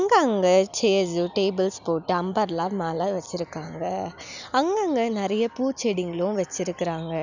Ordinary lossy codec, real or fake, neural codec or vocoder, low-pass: none; real; none; 7.2 kHz